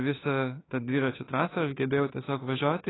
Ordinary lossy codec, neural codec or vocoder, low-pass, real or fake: AAC, 16 kbps; autoencoder, 48 kHz, 32 numbers a frame, DAC-VAE, trained on Japanese speech; 7.2 kHz; fake